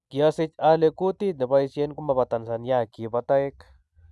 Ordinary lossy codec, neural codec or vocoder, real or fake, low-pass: none; none; real; none